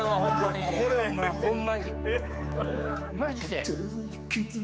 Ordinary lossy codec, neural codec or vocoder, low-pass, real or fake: none; codec, 16 kHz, 4 kbps, X-Codec, HuBERT features, trained on general audio; none; fake